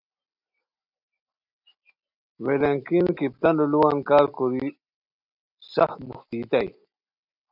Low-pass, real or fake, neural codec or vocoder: 5.4 kHz; real; none